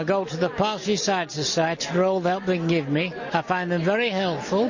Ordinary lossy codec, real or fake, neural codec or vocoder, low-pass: MP3, 32 kbps; real; none; 7.2 kHz